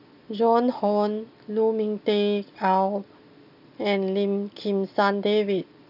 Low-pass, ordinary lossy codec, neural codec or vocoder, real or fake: 5.4 kHz; none; none; real